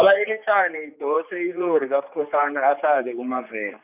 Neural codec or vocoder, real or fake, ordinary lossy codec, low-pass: codec, 16 kHz, 4 kbps, X-Codec, HuBERT features, trained on general audio; fake; none; 3.6 kHz